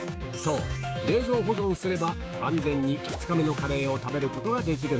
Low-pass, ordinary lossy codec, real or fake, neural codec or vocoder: none; none; fake; codec, 16 kHz, 6 kbps, DAC